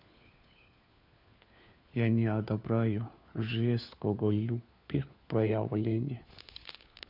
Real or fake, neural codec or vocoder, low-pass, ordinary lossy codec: fake; codec, 16 kHz, 4 kbps, FunCodec, trained on LibriTTS, 50 frames a second; 5.4 kHz; none